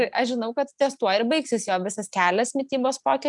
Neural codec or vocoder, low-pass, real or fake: none; 10.8 kHz; real